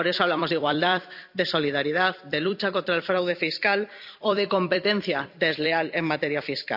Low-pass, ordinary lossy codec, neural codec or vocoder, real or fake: 5.4 kHz; none; vocoder, 44.1 kHz, 128 mel bands every 256 samples, BigVGAN v2; fake